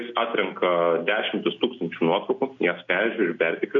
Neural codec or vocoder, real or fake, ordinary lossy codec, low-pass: none; real; AAC, 64 kbps; 7.2 kHz